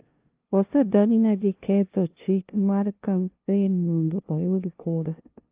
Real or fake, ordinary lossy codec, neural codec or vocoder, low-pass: fake; Opus, 32 kbps; codec, 16 kHz, 0.5 kbps, FunCodec, trained on LibriTTS, 25 frames a second; 3.6 kHz